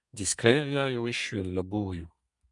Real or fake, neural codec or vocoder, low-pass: fake; codec, 32 kHz, 1.9 kbps, SNAC; 10.8 kHz